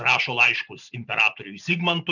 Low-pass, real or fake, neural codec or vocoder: 7.2 kHz; real; none